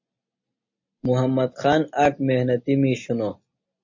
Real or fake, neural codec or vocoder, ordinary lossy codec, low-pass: real; none; MP3, 32 kbps; 7.2 kHz